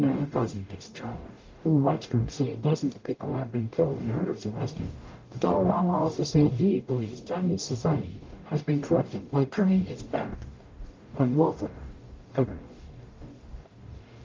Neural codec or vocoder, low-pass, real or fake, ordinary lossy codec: codec, 44.1 kHz, 0.9 kbps, DAC; 7.2 kHz; fake; Opus, 32 kbps